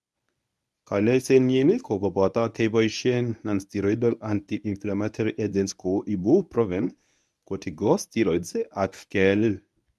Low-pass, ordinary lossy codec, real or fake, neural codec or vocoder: none; none; fake; codec, 24 kHz, 0.9 kbps, WavTokenizer, medium speech release version 1